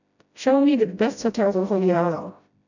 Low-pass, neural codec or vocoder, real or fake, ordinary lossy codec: 7.2 kHz; codec, 16 kHz, 0.5 kbps, FreqCodec, smaller model; fake; none